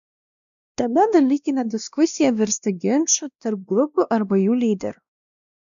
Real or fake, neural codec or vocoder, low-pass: fake; codec, 16 kHz, 1 kbps, X-Codec, WavLM features, trained on Multilingual LibriSpeech; 7.2 kHz